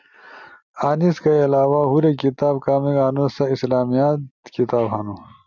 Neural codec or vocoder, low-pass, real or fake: none; 7.2 kHz; real